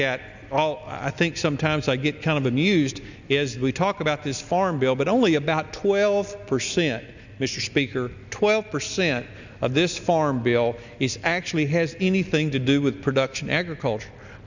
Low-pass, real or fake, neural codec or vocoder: 7.2 kHz; real; none